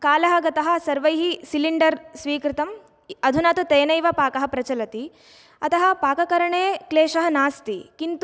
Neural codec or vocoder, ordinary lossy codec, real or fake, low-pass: none; none; real; none